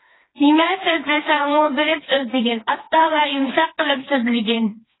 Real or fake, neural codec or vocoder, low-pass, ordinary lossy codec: fake; codec, 16 kHz, 2 kbps, FreqCodec, smaller model; 7.2 kHz; AAC, 16 kbps